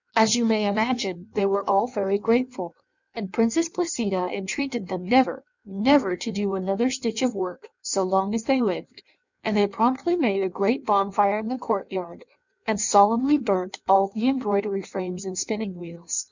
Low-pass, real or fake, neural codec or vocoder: 7.2 kHz; fake; codec, 16 kHz in and 24 kHz out, 1.1 kbps, FireRedTTS-2 codec